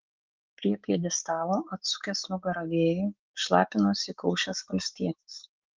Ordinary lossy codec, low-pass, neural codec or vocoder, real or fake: Opus, 32 kbps; 7.2 kHz; codec, 16 kHz, 6 kbps, DAC; fake